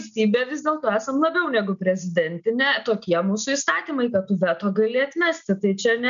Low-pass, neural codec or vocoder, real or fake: 7.2 kHz; none; real